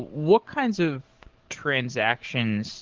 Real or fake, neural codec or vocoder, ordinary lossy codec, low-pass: fake; codec, 44.1 kHz, 7.8 kbps, DAC; Opus, 16 kbps; 7.2 kHz